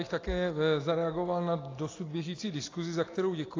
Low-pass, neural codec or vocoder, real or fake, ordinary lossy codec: 7.2 kHz; none; real; AAC, 32 kbps